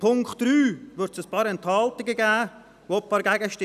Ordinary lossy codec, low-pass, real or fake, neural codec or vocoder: none; 14.4 kHz; real; none